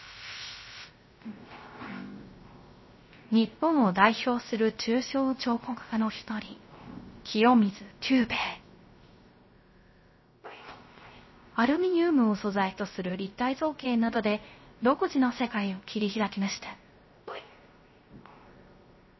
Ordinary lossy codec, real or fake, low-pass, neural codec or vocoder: MP3, 24 kbps; fake; 7.2 kHz; codec, 16 kHz, 0.3 kbps, FocalCodec